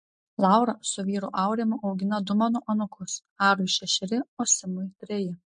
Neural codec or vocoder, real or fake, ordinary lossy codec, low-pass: none; real; MP3, 48 kbps; 9.9 kHz